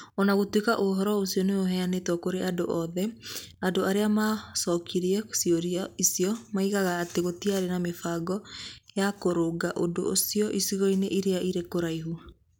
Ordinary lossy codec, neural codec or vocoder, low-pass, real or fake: none; none; none; real